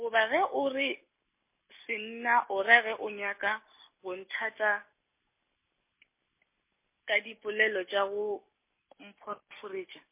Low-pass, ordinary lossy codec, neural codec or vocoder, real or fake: 3.6 kHz; MP3, 24 kbps; none; real